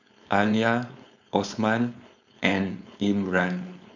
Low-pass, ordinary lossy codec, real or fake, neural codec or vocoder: 7.2 kHz; none; fake; codec, 16 kHz, 4.8 kbps, FACodec